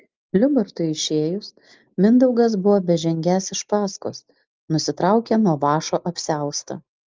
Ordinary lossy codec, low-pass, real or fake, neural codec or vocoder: Opus, 32 kbps; 7.2 kHz; real; none